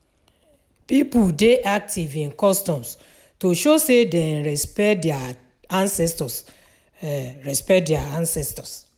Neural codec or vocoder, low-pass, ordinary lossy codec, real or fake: none; none; none; real